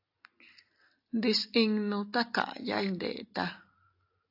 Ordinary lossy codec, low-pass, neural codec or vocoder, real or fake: AAC, 32 kbps; 5.4 kHz; none; real